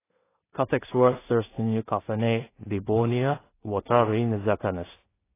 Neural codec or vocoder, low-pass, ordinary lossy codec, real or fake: codec, 16 kHz in and 24 kHz out, 0.4 kbps, LongCat-Audio-Codec, two codebook decoder; 3.6 kHz; AAC, 16 kbps; fake